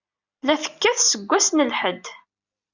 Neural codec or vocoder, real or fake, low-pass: none; real; 7.2 kHz